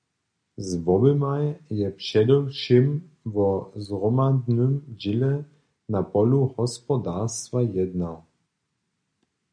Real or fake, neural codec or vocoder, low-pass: real; none; 9.9 kHz